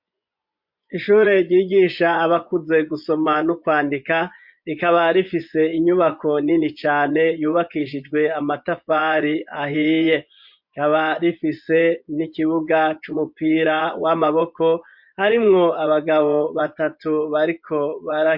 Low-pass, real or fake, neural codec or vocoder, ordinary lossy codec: 5.4 kHz; fake; vocoder, 24 kHz, 100 mel bands, Vocos; MP3, 48 kbps